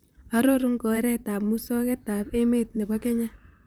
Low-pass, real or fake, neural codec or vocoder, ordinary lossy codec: none; fake; vocoder, 44.1 kHz, 128 mel bands, Pupu-Vocoder; none